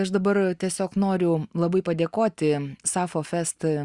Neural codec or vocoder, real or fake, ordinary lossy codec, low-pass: none; real; Opus, 64 kbps; 10.8 kHz